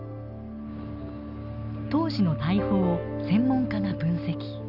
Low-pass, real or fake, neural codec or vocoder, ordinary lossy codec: 5.4 kHz; real; none; none